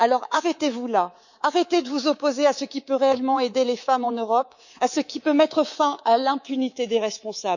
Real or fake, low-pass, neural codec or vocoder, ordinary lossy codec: fake; 7.2 kHz; codec, 24 kHz, 3.1 kbps, DualCodec; none